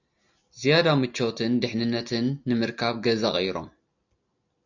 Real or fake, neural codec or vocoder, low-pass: real; none; 7.2 kHz